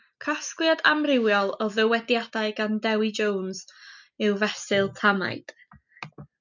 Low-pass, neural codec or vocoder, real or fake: 7.2 kHz; none; real